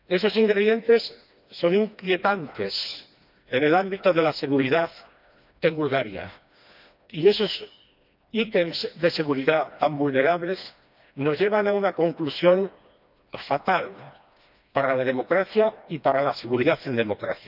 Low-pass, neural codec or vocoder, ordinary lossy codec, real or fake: 5.4 kHz; codec, 16 kHz, 2 kbps, FreqCodec, smaller model; none; fake